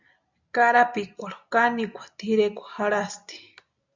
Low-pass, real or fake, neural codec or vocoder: 7.2 kHz; real; none